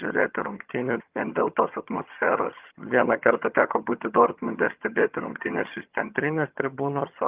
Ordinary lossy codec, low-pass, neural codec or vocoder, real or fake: Opus, 32 kbps; 3.6 kHz; vocoder, 22.05 kHz, 80 mel bands, HiFi-GAN; fake